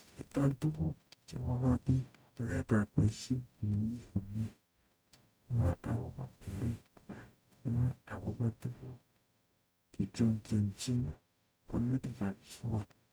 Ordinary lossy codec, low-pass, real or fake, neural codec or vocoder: none; none; fake; codec, 44.1 kHz, 0.9 kbps, DAC